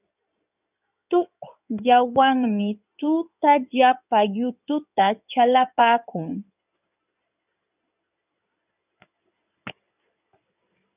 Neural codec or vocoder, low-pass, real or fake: codec, 16 kHz in and 24 kHz out, 2.2 kbps, FireRedTTS-2 codec; 3.6 kHz; fake